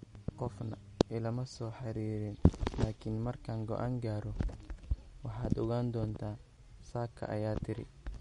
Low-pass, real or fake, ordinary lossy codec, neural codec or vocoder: 10.8 kHz; real; MP3, 48 kbps; none